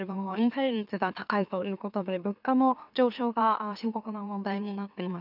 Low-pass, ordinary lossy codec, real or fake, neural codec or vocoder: 5.4 kHz; none; fake; autoencoder, 44.1 kHz, a latent of 192 numbers a frame, MeloTTS